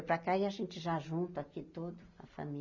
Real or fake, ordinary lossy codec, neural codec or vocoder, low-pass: real; none; none; 7.2 kHz